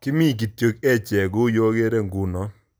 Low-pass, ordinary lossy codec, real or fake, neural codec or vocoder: none; none; real; none